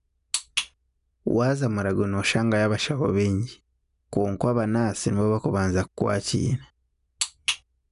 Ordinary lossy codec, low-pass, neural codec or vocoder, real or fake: none; 10.8 kHz; none; real